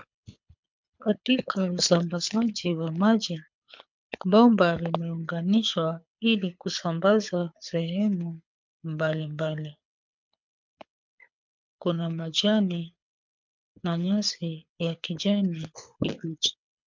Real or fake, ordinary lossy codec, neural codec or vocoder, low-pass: fake; MP3, 64 kbps; codec, 24 kHz, 6 kbps, HILCodec; 7.2 kHz